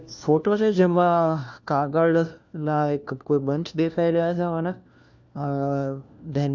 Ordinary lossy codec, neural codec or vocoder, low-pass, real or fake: none; codec, 16 kHz, 1 kbps, FunCodec, trained on LibriTTS, 50 frames a second; none; fake